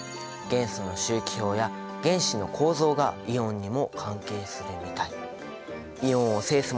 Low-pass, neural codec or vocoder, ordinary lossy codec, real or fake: none; none; none; real